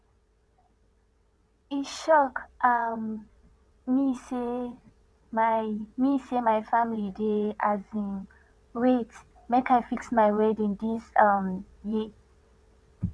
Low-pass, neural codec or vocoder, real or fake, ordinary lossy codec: none; vocoder, 22.05 kHz, 80 mel bands, WaveNeXt; fake; none